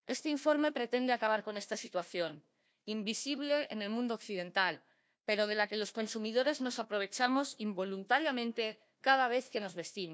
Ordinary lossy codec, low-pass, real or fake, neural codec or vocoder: none; none; fake; codec, 16 kHz, 1 kbps, FunCodec, trained on Chinese and English, 50 frames a second